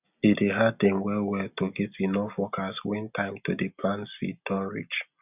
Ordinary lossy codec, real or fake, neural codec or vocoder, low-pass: none; real; none; 3.6 kHz